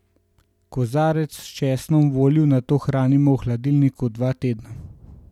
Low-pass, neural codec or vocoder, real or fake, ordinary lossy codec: 19.8 kHz; none; real; none